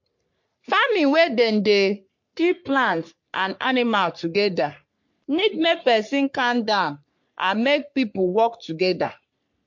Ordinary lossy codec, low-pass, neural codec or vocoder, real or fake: MP3, 48 kbps; 7.2 kHz; codec, 44.1 kHz, 3.4 kbps, Pupu-Codec; fake